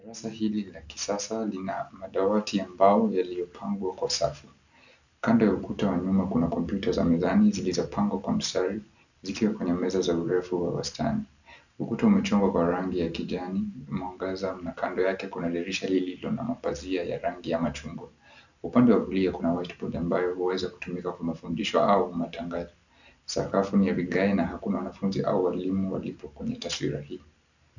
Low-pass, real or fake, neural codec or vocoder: 7.2 kHz; real; none